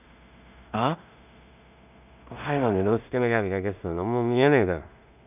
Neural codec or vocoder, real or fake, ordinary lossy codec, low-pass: codec, 16 kHz in and 24 kHz out, 0.4 kbps, LongCat-Audio-Codec, two codebook decoder; fake; none; 3.6 kHz